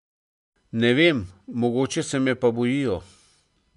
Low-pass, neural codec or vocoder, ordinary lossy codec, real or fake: 10.8 kHz; none; none; real